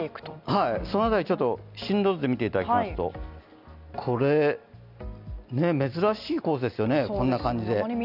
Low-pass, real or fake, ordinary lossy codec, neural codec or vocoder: 5.4 kHz; real; none; none